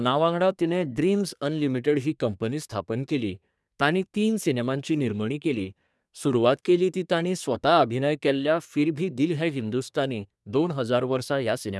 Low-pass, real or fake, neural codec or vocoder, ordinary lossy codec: none; fake; codec, 24 kHz, 1 kbps, SNAC; none